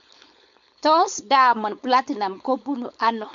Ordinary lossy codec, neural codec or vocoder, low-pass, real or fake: none; codec, 16 kHz, 4.8 kbps, FACodec; 7.2 kHz; fake